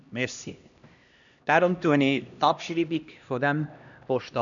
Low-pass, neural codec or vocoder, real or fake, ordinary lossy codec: 7.2 kHz; codec, 16 kHz, 1 kbps, X-Codec, HuBERT features, trained on LibriSpeech; fake; none